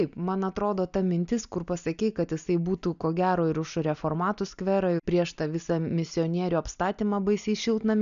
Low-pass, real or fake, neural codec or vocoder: 7.2 kHz; real; none